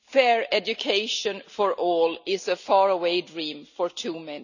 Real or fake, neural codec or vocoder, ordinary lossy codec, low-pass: real; none; none; 7.2 kHz